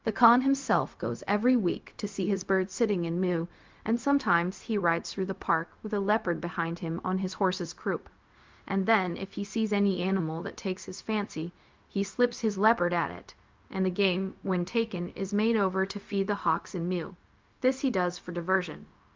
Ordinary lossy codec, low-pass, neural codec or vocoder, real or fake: Opus, 32 kbps; 7.2 kHz; codec, 16 kHz, 0.4 kbps, LongCat-Audio-Codec; fake